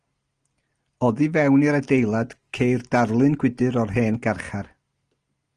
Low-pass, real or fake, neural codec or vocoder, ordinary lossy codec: 9.9 kHz; real; none; Opus, 24 kbps